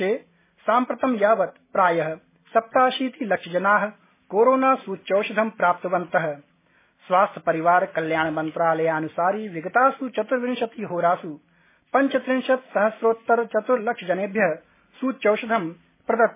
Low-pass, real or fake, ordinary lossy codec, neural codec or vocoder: 3.6 kHz; real; MP3, 16 kbps; none